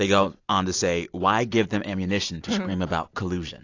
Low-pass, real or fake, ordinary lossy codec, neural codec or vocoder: 7.2 kHz; real; AAC, 48 kbps; none